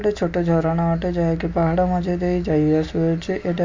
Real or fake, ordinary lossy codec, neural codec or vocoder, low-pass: real; MP3, 48 kbps; none; 7.2 kHz